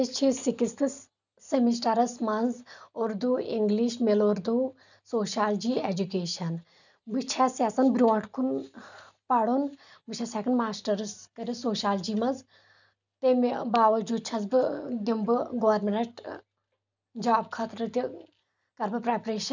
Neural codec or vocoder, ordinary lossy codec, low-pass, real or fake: none; none; 7.2 kHz; real